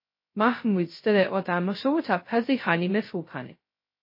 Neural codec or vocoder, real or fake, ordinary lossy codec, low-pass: codec, 16 kHz, 0.2 kbps, FocalCodec; fake; MP3, 24 kbps; 5.4 kHz